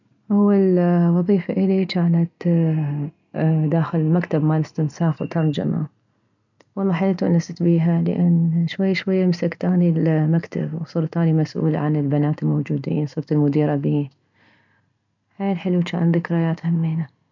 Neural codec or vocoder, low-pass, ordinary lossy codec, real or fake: none; 7.2 kHz; none; real